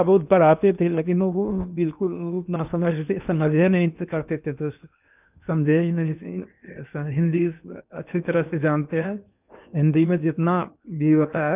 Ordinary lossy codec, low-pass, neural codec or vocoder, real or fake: none; 3.6 kHz; codec, 16 kHz in and 24 kHz out, 0.8 kbps, FocalCodec, streaming, 65536 codes; fake